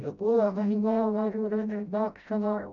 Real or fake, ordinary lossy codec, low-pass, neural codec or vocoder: fake; none; 7.2 kHz; codec, 16 kHz, 0.5 kbps, FreqCodec, smaller model